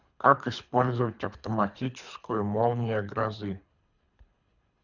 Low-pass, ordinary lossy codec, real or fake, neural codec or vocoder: 7.2 kHz; none; fake; codec, 24 kHz, 3 kbps, HILCodec